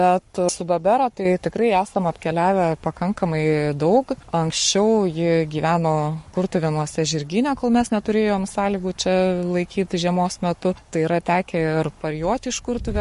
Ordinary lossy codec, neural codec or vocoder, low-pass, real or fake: MP3, 48 kbps; codec, 44.1 kHz, 7.8 kbps, Pupu-Codec; 14.4 kHz; fake